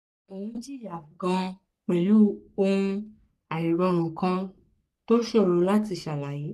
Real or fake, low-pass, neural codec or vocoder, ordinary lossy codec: fake; 14.4 kHz; codec, 44.1 kHz, 3.4 kbps, Pupu-Codec; none